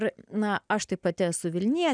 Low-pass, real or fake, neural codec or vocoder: 9.9 kHz; real; none